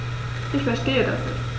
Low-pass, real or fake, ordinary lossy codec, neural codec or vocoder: none; real; none; none